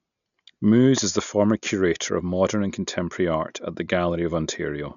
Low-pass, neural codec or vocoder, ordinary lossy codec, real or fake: 7.2 kHz; none; none; real